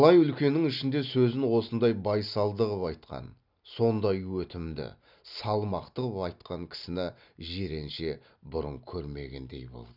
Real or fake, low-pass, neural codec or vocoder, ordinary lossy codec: real; 5.4 kHz; none; none